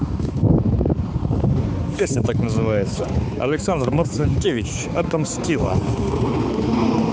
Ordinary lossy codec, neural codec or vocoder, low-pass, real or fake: none; codec, 16 kHz, 4 kbps, X-Codec, HuBERT features, trained on balanced general audio; none; fake